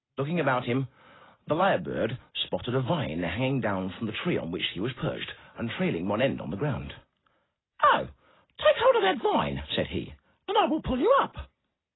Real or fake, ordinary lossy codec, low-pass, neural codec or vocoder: real; AAC, 16 kbps; 7.2 kHz; none